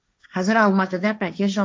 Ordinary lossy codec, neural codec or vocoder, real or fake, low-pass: none; codec, 16 kHz, 1.1 kbps, Voila-Tokenizer; fake; none